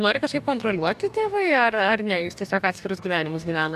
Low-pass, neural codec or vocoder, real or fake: 14.4 kHz; codec, 44.1 kHz, 2.6 kbps, DAC; fake